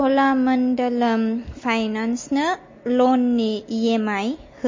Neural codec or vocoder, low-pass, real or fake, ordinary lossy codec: none; 7.2 kHz; real; MP3, 32 kbps